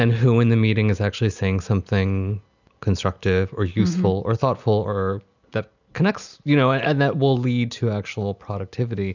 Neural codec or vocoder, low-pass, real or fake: none; 7.2 kHz; real